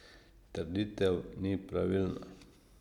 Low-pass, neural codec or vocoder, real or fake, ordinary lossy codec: 19.8 kHz; none; real; none